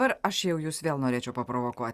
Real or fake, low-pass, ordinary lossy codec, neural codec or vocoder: real; 14.4 kHz; Opus, 64 kbps; none